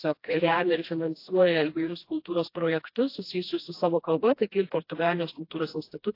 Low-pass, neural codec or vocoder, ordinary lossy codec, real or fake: 5.4 kHz; codec, 16 kHz, 1 kbps, FreqCodec, smaller model; AAC, 32 kbps; fake